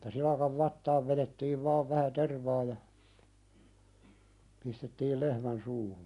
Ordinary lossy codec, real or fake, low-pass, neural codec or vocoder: none; real; 10.8 kHz; none